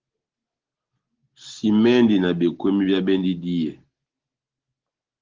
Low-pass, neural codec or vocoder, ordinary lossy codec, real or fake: 7.2 kHz; none; Opus, 16 kbps; real